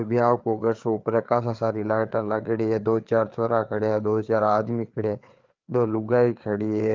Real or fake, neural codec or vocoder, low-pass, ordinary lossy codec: fake; codec, 16 kHz, 4 kbps, FreqCodec, larger model; 7.2 kHz; Opus, 24 kbps